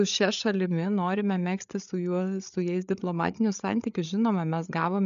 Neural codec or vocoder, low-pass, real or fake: codec, 16 kHz, 8 kbps, FreqCodec, larger model; 7.2 kHz; fake